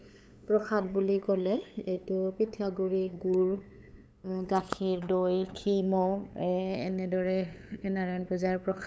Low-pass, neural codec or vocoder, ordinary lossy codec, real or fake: none; codec, 16 kHz, 8 kbps, FunCodec, trained on LibriTTS, 25 frames a second; none; fake